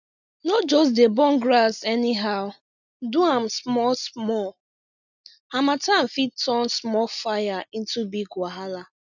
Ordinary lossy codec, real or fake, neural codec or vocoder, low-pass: none; real; none; 7.2 kHz